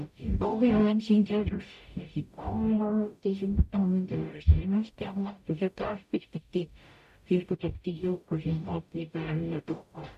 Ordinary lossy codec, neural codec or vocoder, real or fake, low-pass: AAC, 96 kbps; codec, 44.1 kHz, 0.9 kbps, DAC; fake; 14.4 kHz